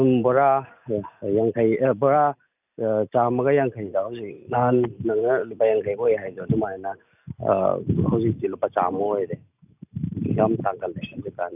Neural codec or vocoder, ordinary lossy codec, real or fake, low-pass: none; none; real; 3.6 kHz